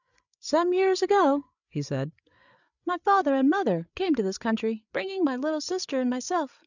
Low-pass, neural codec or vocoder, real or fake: 7.2 kHz; codec, 16 kHz, 8 kbps, FreqCodec, larger model; fake